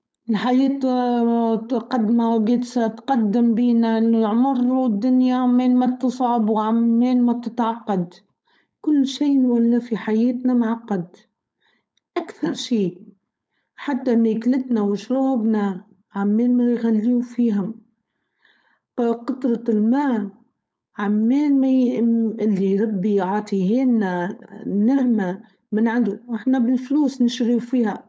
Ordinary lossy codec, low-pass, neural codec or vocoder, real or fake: none; none; codec, 16 kHz, 4.8 kbps, FACodec; fake